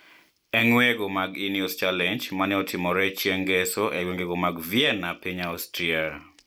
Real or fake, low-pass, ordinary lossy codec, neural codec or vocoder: real; none; none; none